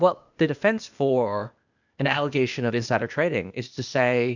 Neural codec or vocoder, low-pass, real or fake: codec, 16 kHz, 0.8 kbps, ZipCodec; 7.2 kHz; fake